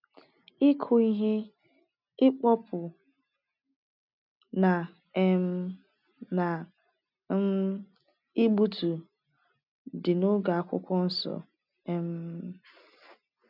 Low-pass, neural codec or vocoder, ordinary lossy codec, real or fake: 5.4 kHz; none; none; real